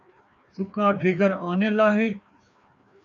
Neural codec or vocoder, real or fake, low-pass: codec, 16 kHz, 2 kbps, FreqCodec, larger model; fake; 7.2 kHz